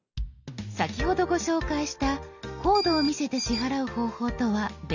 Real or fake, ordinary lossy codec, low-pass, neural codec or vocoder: real; none; 7.2 kHz; none